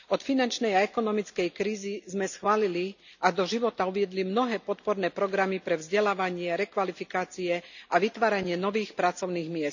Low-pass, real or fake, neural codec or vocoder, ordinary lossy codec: 7.2 kHz; real; none; none